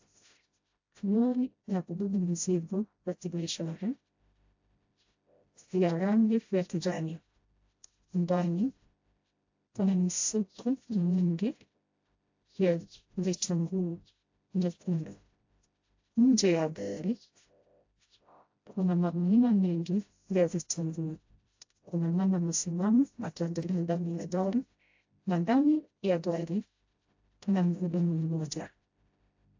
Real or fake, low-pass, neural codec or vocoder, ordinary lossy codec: fake; 7.2 kHz; codec, 16 kHz, 0.5 kbps, FreqCodec, smaller model; AAC, 48 kbps